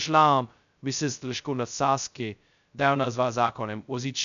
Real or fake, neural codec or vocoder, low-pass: fake; codec, 16 kHz, 0.2 kbps, FocalCodec; 7.2 kHz